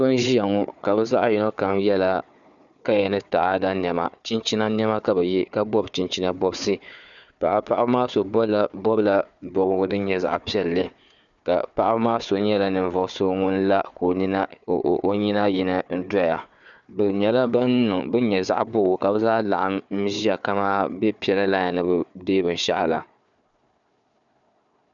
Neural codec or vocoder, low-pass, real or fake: codec, 16 kHz, 4 kbps, FunCodec, trained on Chinese and English, 50 frames a second; 7.2 kHz; fake